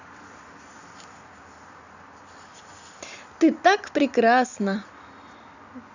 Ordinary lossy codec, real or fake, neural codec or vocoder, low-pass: none; real; none; 7.2 kHz